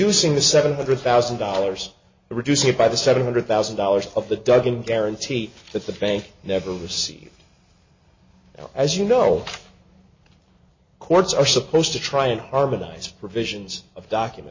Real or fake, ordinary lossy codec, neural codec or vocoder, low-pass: real; MP3, 32 kbps; none; 7.2 kHz